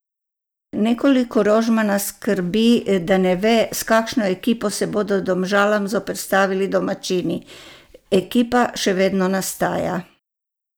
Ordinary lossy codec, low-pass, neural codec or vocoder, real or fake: none; none; none; real